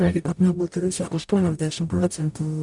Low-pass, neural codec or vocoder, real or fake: 10.8 kHz; codec, 44.1 kHz, 0.9 kbps, DAC; fake